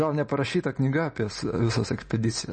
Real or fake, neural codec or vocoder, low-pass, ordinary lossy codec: real; none; 10.8 kHz; MP3, 32 kbps